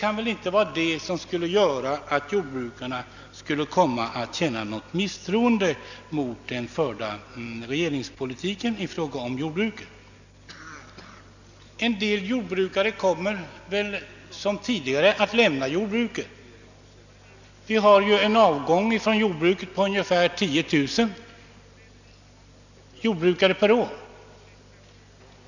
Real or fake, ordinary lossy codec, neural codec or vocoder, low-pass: real; none; none; 7.2 kHz